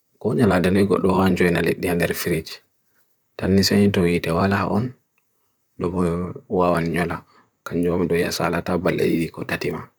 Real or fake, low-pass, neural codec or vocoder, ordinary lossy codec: fake; none; vocoder, 44.1 kHz, 128 mel bands, Pupu-Vocoder; none